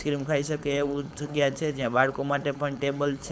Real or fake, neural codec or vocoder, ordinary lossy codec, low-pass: fake; codec, 16 kHz, 4.8 kbps, FACodec; none; none